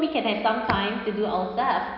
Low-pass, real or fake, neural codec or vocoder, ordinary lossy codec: 5.4 kHz; real; none; none